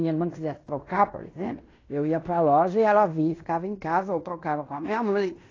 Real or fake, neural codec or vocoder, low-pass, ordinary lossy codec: fake; codec, 16 kHz in and 24 kHz out, 0.9 kbps, LongCat-Audio-Codec, fine tuned four codebook decoder; 7.2 kHz; AAC, 32 kbps